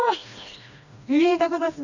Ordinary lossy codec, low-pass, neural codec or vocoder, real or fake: none; 7.2 kHz; codec, 16 kHz, 1 kbps, FreqCodec, smaller model; fake